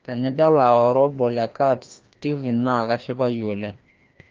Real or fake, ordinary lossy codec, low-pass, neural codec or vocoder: fake; Opus, 24 kbps; 7.2 kHz; codec, 16 kHz, 1 kbps, FreqCodec, larger model